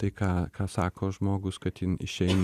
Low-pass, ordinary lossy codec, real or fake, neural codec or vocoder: 14.4 kHz; Opus, 64 kbps; real; none